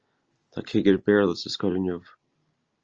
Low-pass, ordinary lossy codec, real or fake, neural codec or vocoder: 7.2 kHz; Opus, 24 kbps; real; none